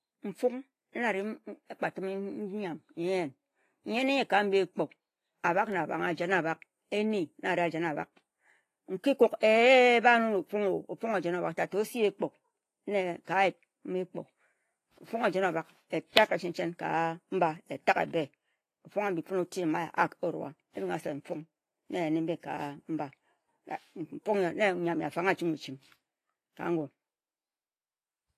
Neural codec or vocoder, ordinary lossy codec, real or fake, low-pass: none; AAC, 48 kbps; real; 14.4 kHz